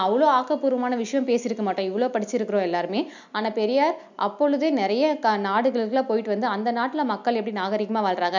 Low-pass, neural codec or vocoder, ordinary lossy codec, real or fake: 7.2 kHz; none; none; real